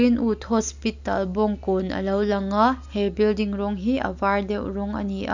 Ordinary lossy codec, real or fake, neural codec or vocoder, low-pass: none; real; none; 7.2 kHz